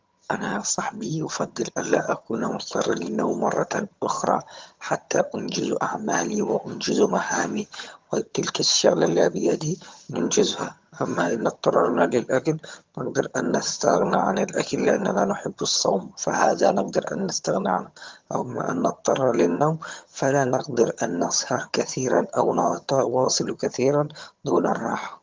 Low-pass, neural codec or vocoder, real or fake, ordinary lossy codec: 7.2 kHz; vocoder, 22.05 kHz, 80 mel bands, HiFi-GAN; fake; Opus, 32 kbps